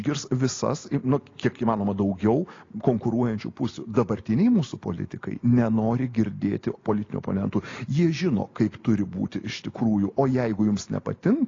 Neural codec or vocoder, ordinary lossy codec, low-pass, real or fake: none; AAC, 32 kbps; 7.2 kHz; real